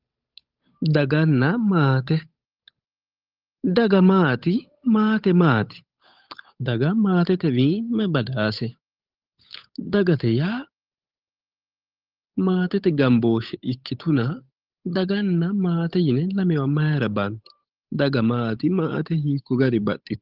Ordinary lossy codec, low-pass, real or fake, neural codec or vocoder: Opus, 32 kbps; 5.4 kHz; fake; codec, 16 kHz, 8 kbps, FunCodec, trained on Chinese and English, 25 frames a second